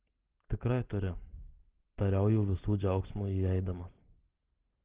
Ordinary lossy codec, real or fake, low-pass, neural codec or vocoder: Opus, 16 kbps; real; 3.6 kHz; none